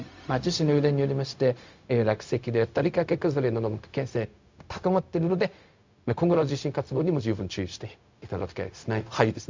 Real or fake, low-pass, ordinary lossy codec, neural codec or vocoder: fake; 7.2 kHz; MP3, 64 kbps; codec, 16 kHz, 0.4 kbps, LongCat-Audio-Codec